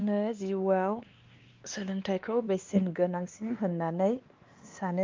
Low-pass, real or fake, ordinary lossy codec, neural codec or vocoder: 7.2 kHz; fake; Opus, 32 kbps; codec, 16 kHz, 2 kbps, X-Codec, WavLM features, trained on Multilingual LibriSpeech